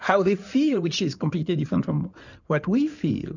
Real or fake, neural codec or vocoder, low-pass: fake; codec, 16 kHz in and 24 kHz out, 2.2 kbps, FireRedTTS-2 codec; 7.2 kHz